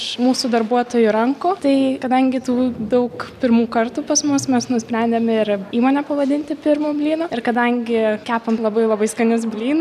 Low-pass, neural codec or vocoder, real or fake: 14.4 kHz; none; real